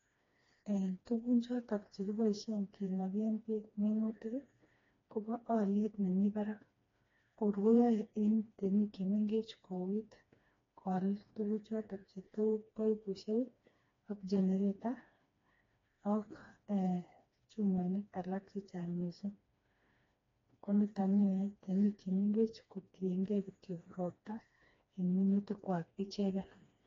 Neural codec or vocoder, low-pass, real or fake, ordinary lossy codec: codec, 16 kHz, 2 kbps, FreqCodec, smaller model; 7.2 kHz; fake; AAC, 32 kbps